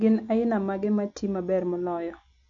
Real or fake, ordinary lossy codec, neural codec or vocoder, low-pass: real; none; none; 7.2 kHz